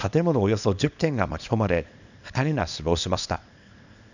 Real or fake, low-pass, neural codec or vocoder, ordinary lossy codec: fake; 7.2 kHz; codec, 24 kHz, 0.9 kbps, WavTokenizer, small release; none